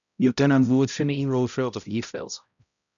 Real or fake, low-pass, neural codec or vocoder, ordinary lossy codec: fake; 7.2 kHz; codec, 16 kHz, 0.5 kbps, X-Codec, HuBERT features, trained on balanced general audio; MP3, 96 kbps